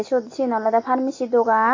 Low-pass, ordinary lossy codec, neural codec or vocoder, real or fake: 7.2 kHz; AAC, 32 kbps; none; real